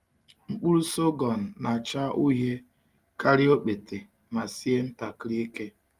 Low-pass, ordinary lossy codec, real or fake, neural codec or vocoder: 14.4 kHz; Opus, 32 kbps; real; none